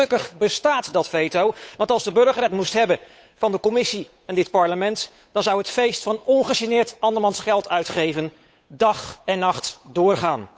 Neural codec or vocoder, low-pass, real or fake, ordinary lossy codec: codec, 16 kHz, 8 kbps, FunCodec, trained on Chinese and English, 25 frames a second; none; fake; none